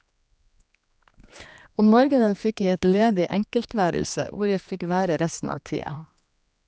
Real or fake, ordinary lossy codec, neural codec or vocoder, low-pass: fake; none; codec, 16 kHz, 2 kbps, X-Codec, HuBERT features, trained on general audio; none